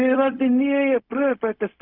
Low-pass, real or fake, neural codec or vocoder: 5.4 kHz; fake; codec, 16 kHz, 0.4 kbps, LongCat-Audio-Codec